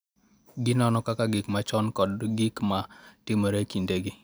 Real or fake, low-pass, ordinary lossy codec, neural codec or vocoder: real; none; none; none